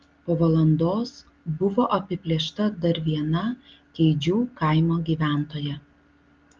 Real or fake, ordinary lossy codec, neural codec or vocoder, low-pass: real; Opus, 32 kbps; none; 7.2 kHz